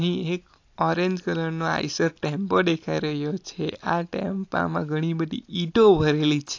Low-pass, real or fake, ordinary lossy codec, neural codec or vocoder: 7.2 kHz; real; AAC, 48 kbps; none